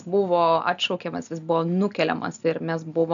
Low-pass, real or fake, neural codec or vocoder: 7.2 kHz; real; none